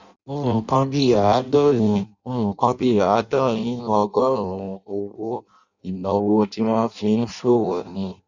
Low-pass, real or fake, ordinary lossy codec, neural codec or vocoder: 7.2 kHz; fake; none; codec, 16 kHz in and 24 kHz out, 0.6 kbps, FireRedTTS-2 codec